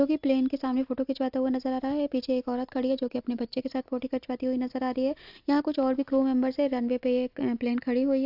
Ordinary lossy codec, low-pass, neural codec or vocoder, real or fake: AAC, 48 kbps; 5.4 kHz; none; real